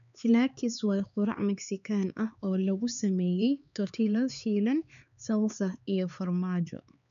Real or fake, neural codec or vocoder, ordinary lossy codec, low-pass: fake; codec, 16 kHz, 4 kbps, X-Codec, HuBERT features, trained on LibriSpeech; none; 7.2 kHz